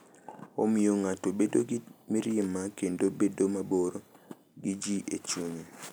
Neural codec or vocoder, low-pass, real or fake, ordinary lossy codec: none; none; real; none